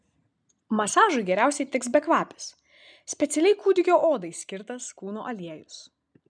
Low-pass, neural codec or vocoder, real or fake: 9.9 kHz; none; real